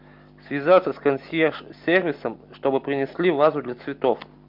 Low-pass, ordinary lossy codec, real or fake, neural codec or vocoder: 5.4 kHz; MP3, 48 kbps; real; none